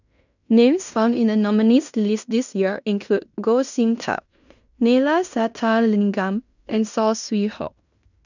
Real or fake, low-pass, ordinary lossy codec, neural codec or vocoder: fake; 7.2 kHz; none; codec, 16 kHz in and 24 kHz out, 0.9 kbps, LongCat-Audio-Codec, fine tuned four codebook decoder